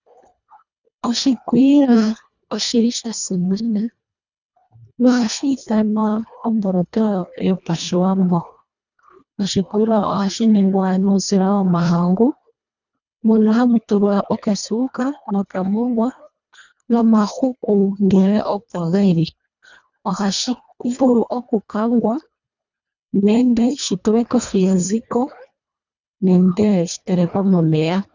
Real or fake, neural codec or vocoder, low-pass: fake; codec, 24 kHz, 1.5 kbps, HILCodec; 7.2 kHz